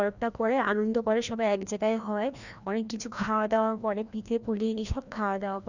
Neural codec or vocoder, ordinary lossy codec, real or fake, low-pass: codec, 16 kHz, 1 kbps, FreqCodec, larger model; none; fake; 7.2 kHz